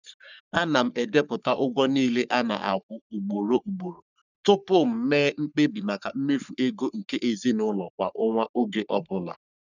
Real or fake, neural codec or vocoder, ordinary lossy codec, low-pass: fake; codec, 44.1 kHz, 3.4 kbps, Pupu-Codec; none; 7.2 kHz